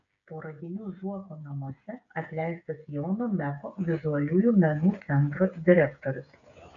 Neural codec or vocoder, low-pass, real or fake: codec, 16 kHz, 8 kbps, FreqCodec, smaller model; 7.2 kHz; fake